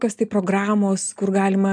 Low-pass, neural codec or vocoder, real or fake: 9.9 kHz; none; real